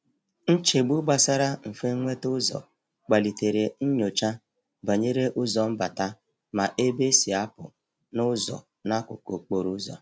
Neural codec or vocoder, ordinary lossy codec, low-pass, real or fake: none; none; none; real